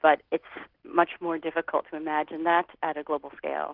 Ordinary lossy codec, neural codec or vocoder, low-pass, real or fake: Opus, 16 kbps; none; 5.4 kHz; real